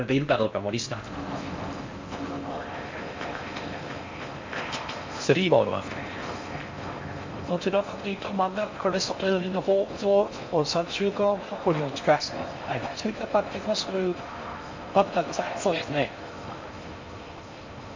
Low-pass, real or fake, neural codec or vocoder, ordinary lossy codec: 7.2 kHz; fake; codec, 16 kHz in and 24 kHz out, 0.6 kbps, FocalCodec, streaming, 4096 codes; MP3, 48 kbps